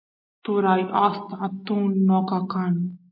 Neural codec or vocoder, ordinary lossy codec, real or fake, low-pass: none; MP3, 32 kbps; real; 5.4 kHz